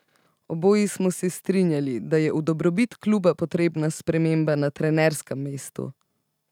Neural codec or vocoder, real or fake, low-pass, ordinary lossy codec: none; real; 19.8 kHz; none